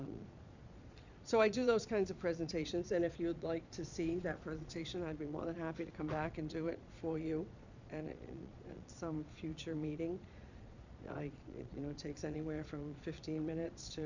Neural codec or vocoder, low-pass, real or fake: vocoder, 22.05 kHz, 80 mel bands, Vocos; 7.2 kHz; fake